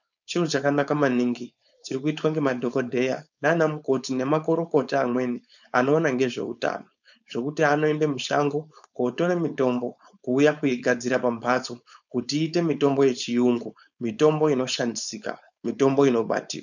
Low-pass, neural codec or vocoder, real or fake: 7.2 kHz; codec, 16 kHz, 4.8 kbps, FACodec; fake